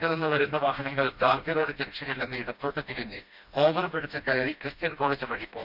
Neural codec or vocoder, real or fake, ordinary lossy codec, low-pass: codec, 16 kHz, 1 kbps, FreqCodec, smaller model; fake; none; 5.4 kHz